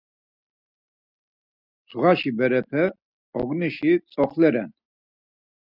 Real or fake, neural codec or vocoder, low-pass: real; none; 5.4 kHz